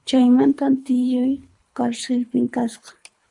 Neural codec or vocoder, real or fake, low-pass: codec, 24 kHz, 3 kbps, HILCodec; fake; 10.8 kHz